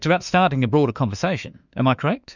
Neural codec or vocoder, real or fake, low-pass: autoencoder, 48 kHz, 32 numbers a frame, DAC-VAE, trained on Japanese speech; fake; 7.2 kHz